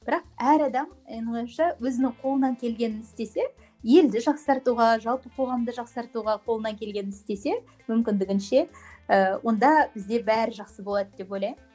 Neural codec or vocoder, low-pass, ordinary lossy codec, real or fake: none; none; none; real